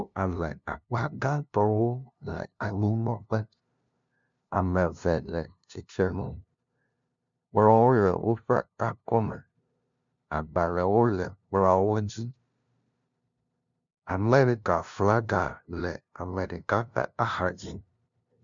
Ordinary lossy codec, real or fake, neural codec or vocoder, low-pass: MP3, 64 kbps; fake; codec, 16 kHz, 0.5 kbps, FunCodec, trained on LibriTTS, 25 frames a second; 7.2 kHz